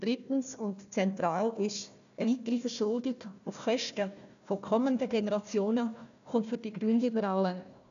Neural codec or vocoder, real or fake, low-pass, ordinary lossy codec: codec, 16 kHz, 1 kbps, FunCodec, trained on Chinese and English, 50 frames a second; fake; 7.2 kHz; none